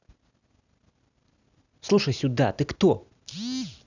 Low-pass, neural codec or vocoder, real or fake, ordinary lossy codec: 7.2 kHz; none; real; none